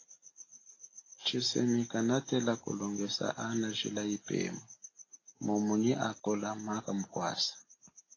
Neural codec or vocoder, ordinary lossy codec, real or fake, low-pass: none; AAC, 32 kbps; real; 7.2 kHz